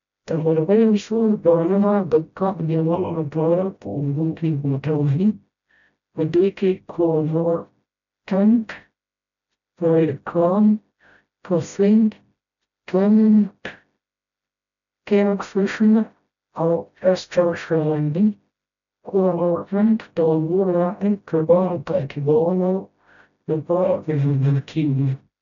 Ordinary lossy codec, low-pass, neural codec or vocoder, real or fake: none; 7.2 kHz; codec, 16 kHz, 0.5 kbps, FreqCodec, smaller model; fake